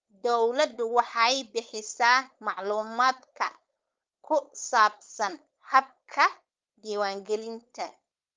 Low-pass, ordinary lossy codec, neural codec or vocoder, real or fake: 7.2 kHz; Opus, 32 kbps; codec, 16 kHz, 4.8 kbps, FACodec; fake